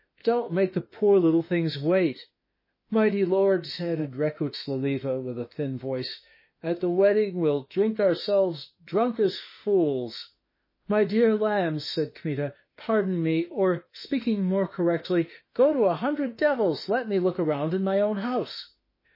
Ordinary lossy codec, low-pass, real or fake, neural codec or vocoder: MP3, 24 kbps; 5.4 kHz; fake; autoencoder, 48 kHz, 32 numbers a frame, DAC-VAE, trained on Japanese speech